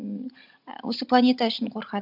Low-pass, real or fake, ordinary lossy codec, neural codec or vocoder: 5.4 kHz; real; none; none